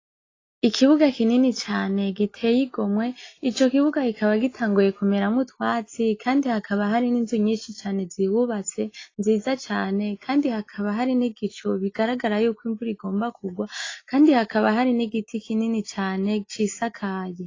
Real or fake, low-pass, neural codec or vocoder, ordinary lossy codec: real; 7.2 kHz; none; AAC, 32 kbps